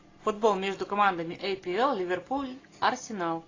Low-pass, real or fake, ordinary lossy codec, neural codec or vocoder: 7.2 kHz; real; AAC, 32 kbps; none